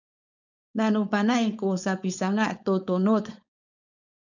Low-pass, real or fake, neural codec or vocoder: 7.2 kHz; fake; codec, 16 kHz, 4.8 kbps, FACodec